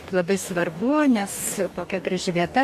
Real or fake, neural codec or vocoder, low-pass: fake; codec, 44.1 kHz, 2.6 kbps, DAC; 14.4 kHz